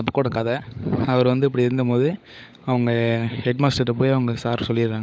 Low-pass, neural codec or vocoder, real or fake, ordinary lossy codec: none; codec, 16 kHz, 16 kbps, FunCodec, trained on LibriTTS, 50 frames a second; fake; none